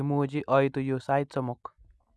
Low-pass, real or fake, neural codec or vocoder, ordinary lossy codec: none; real; none; none